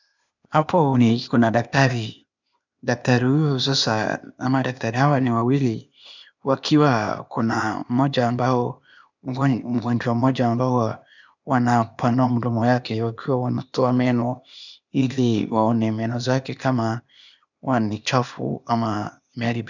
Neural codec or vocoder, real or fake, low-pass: codec, 16 kHz, 0.8 kbps, ZipCodec; fake; 7.2 kHz